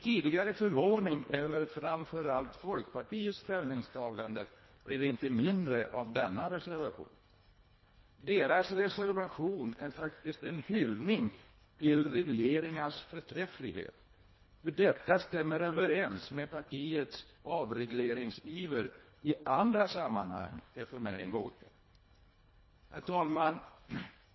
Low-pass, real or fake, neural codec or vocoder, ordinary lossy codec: 7.2 kHz; fake; codec, 24 kHz, 1.5 kbps, HILCodec; MP3, 24 kbps